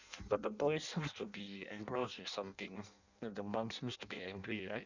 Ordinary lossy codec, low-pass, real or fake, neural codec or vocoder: none; 7.2 kHz; fake; codec, 16 kHz in and 24 kHz out, 0.6 kbps, FireRedTTS-2 codec